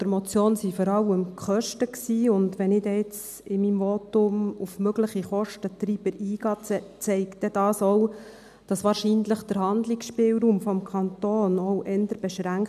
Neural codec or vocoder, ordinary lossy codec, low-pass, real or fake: none; none; 14.4 kHz; real